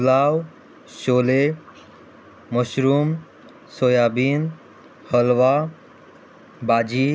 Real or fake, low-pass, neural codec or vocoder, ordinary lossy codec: real; none; none; none